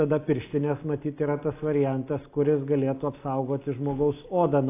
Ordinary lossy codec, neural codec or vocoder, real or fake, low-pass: AAC, 24 kbps; none; real; 3.6 kHz